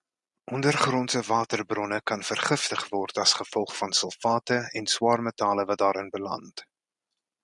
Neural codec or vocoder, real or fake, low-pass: none; real; 10.8 kHz